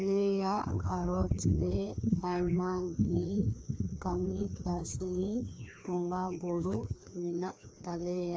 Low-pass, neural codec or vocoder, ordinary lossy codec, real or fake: none; codec, 16 kHz, 2 kbps, FreqCodec, larger model; none; fake